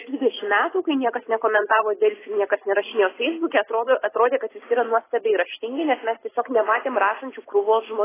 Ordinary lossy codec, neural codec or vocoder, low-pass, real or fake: AAC, 16 kbps; none; 3.6 kHz; real